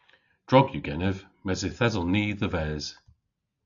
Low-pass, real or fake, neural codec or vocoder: 7.2 kHz; real; none